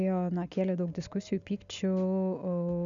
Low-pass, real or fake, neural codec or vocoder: 7.2 kHz; real; none